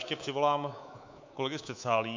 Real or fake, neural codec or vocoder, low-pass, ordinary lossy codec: fake; codec, 24 kHz, 3.1 kbps, DualCodec; 7.2 kHz; MP3, 48 kbps